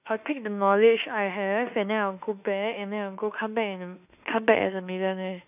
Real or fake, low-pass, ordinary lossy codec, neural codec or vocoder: fake; 3.6 kHz; none; autoencoder, 48 kHz, 32 numbers a frame, DAC-VAE, trained on Japanese speech